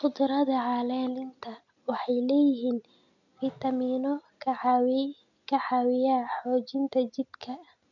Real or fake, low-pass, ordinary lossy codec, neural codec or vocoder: real; 7.2 kHz; none; none